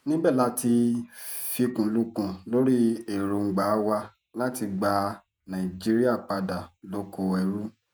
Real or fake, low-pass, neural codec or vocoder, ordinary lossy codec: fake; none; vocoder, 48 kHz, 128 mel bands, Vocos; none